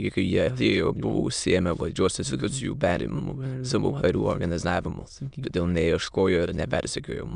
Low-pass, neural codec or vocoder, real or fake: 9.9 kHz; autoencoder, 22.05 kHz, a latent of 192 numbers a frame, VITS, trained on many speakers; fake